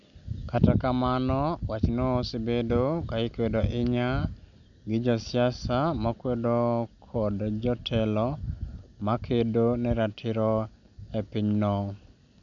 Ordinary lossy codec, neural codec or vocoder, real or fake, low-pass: none; none; real; 7.2 kHz